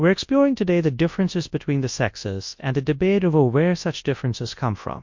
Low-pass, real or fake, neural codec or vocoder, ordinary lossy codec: 7.2 kHz; fake; codec, 24 kHz, 0.9 kbps, WavTokenizer, large speech release; MP3, 48 kbps